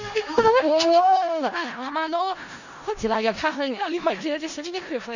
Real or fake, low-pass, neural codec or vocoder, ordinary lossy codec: fake; 7.2 kHz; codec, 16 kHz in and 24 kHz out, 0.4 kbps, LongCat-Audio-Codec, four codebook decoder; none